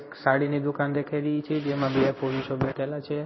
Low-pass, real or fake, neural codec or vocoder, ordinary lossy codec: 7.2 kHz; fake; codec, 16 kHz in and 24 kHz out, 1 kbps, XY-Tokenizer; MP3, 24 kbps